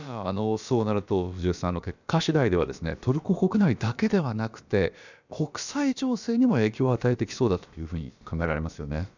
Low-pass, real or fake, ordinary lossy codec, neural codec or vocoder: 7.2 kHz; fake; none; codec, 16 kHz, about 1 kbps, DyCAST, with the encoder's durations